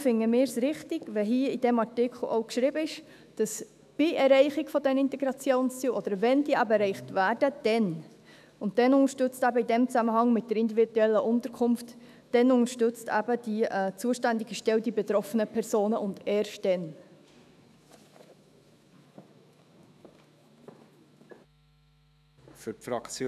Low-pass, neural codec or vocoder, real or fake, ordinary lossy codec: 14.4 kHz; autoencoder, 48 kHz, 128 numbers a frame, DAC-VAE, trained on Japanese speech; fake; none